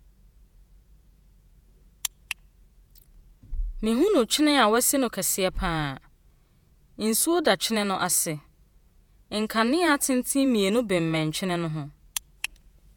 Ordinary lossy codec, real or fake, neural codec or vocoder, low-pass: none; fake; vocoder, 48 kHz, 128 mel bands, Vocos; 19.8 kHz